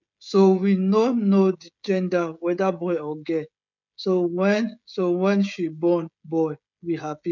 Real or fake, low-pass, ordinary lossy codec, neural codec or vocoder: fake; 7.2 kHz; none; codec, 16 kHz, 16 kbps, FreqCodec, smaller model